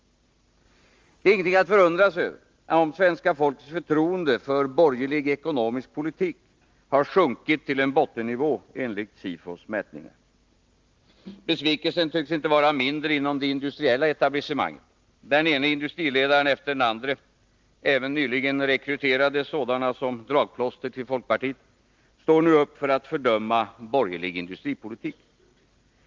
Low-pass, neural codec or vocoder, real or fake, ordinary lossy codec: 7.2 kHz; none; real; Opus, 32 kbps